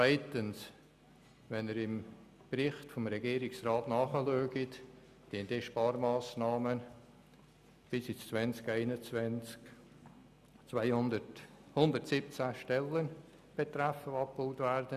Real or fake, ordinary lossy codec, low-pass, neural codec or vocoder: real; none; 14.4 kHz; none